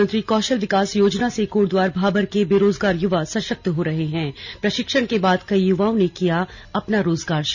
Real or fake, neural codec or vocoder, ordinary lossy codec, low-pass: real; none; none; 7.2 kHz